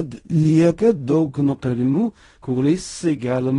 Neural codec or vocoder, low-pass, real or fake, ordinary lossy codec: codec, 16 kHz in and 24 kHz out, 0.4 kbps, LongCat-Audio-Codec, fine tuned four codebook decoder; 10.8 kHz; fake; AAC, 32 kbps